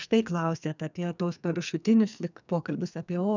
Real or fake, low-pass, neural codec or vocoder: fake; 7.2 kHz; codec, 44.1 kHz, 2.6 kbps, SNAC